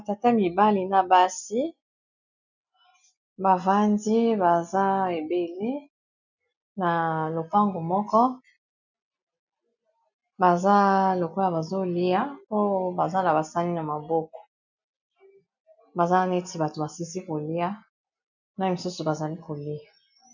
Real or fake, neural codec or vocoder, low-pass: real; none; 7.2 kHz